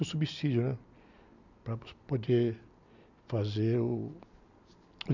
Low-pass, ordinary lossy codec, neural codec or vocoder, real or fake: 7.2 kHz; none; none; real